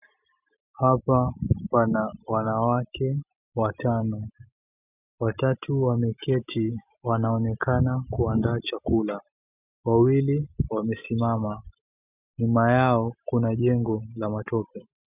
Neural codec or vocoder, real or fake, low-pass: none; real; 3.6 kHz